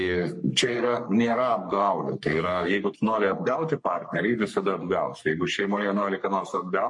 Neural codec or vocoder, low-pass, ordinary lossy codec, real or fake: codec, 44.1 kHz, 3.4 kbps, Pupu-Codec; 10.8 kHz; MP3, 48 kbps; fake